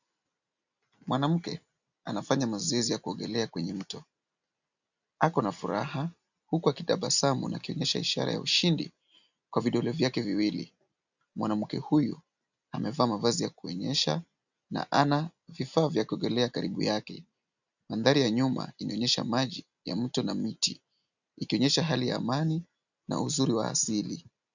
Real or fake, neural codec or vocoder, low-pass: real; none; 7.2 kHz